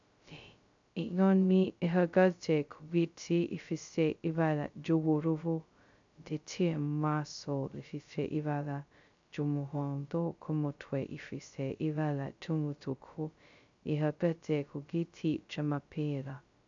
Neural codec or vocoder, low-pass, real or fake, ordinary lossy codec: codec, 16 kHz, 0.2 kbps, FocalCodec; 7.2 kHz; fake; MP3, 64 kbps